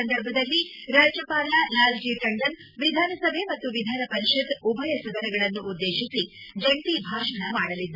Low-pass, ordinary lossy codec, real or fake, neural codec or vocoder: 5.4 kHz; Opus, 64 kbps; real; none